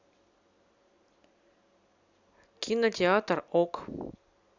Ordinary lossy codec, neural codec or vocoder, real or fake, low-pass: none; none; real; 7.2 kHz